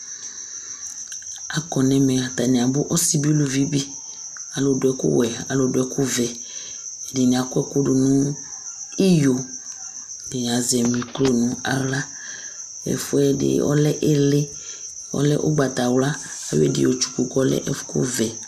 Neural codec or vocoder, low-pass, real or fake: none; 14.4 kHz; real